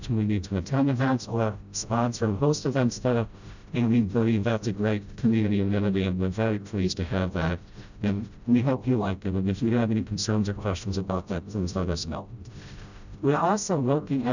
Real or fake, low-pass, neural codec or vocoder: fake; 7.2 kHz; codec, 16 kHz, 0.5 kbps, FreqCodec, smaller model